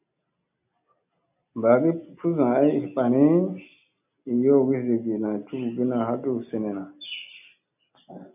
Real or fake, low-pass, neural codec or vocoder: real; 3.6 kHz; none